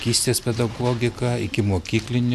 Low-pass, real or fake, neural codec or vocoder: 14.4 kHz; real; none